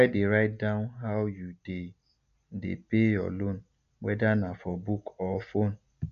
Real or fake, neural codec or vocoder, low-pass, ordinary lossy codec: real; none; 7.2 kHz; none